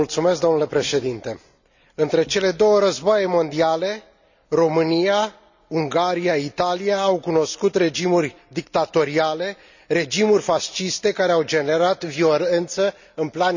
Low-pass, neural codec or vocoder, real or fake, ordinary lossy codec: 7.2 kHz; none; real; none